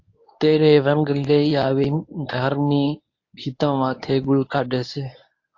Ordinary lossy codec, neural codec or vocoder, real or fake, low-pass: AAC, 48 kbps; codec, 24 kHz, 0.9 kbps, WavTokenizer, medium speech release version 1; fake; 7.2 kHz